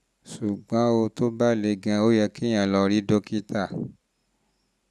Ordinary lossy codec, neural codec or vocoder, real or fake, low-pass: none; none; real; none